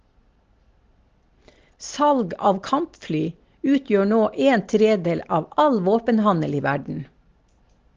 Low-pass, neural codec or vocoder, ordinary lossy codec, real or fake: 7.2 kHz; none; Opus, 16 kbps; real